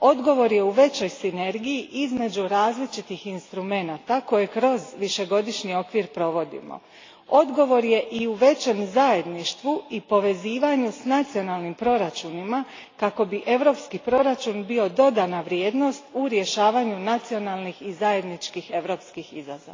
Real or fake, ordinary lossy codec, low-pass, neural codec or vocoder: real; AAC, 32 kbps; 7.2 kHz; none